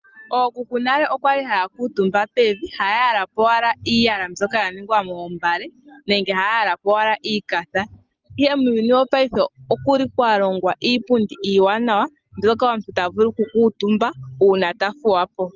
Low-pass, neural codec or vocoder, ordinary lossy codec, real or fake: 7.2 kHz; none; Opus, 24 kbps; real